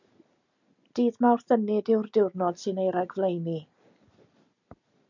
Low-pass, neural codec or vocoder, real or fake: 7.2 kHz; none; real